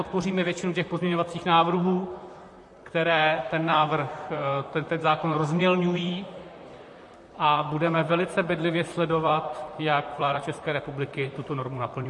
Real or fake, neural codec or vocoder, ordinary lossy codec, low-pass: fake; vocoder, 44.1 kHz, 128 mel bands, Pupu-Vocoder; MP3, 48 kbps; 10.8 kHz